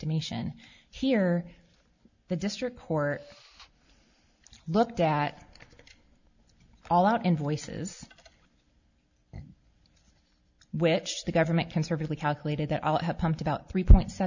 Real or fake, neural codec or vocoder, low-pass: real; none; 7.2 kHz